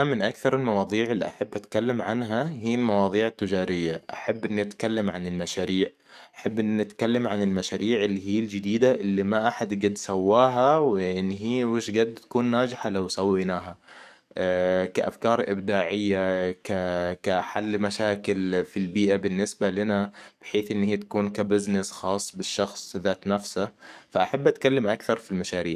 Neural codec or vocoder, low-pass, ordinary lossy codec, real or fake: codec, 44.1 kHz, 7.8 kbps, DAC; 14.4 kHz; none; fake